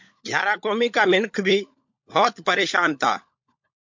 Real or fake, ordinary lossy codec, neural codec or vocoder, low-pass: fake; MP3, 48 kbps; codec, 16 kHz, 16 kbps, FunCodec, trained on LibriTTS, 50 frames a second; 7.2 kHz